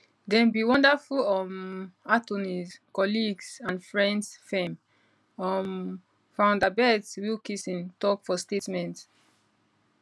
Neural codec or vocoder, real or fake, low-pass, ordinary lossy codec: none; real; none; none